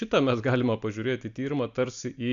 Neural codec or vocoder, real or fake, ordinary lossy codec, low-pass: none; real; MP3, 64 kbps; 7.2 kHz